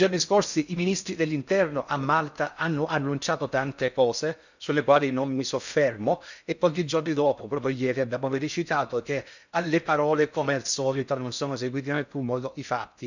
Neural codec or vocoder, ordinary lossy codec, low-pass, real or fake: codec, 16 kHz in and 24 kHz out, 0.6 kbps, FocalCodec, streaming, 4096 codes; none; 7.2 kHz; fake